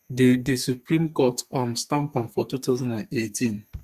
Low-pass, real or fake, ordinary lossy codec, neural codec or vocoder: 14.4 kHz; fake; Opus, 64 kbps; codec, 32 kHz, 1.9 kbps, SNAC